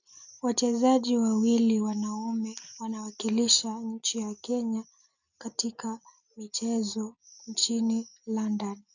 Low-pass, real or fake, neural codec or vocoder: 7.2 kHz; real; none